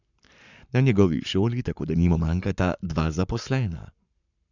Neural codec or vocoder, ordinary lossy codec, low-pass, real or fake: codec, 44.1 kHz, 7.8 kbps, Pupu-Codec; none; 7.2 kHz; fake